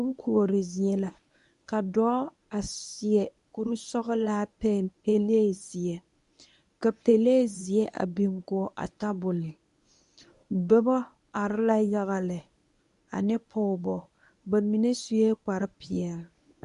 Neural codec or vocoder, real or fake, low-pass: codec, 24 kHz, 0.9 kbps, WavTokenizer, medium speech release version 1; fake; 10.8 kHz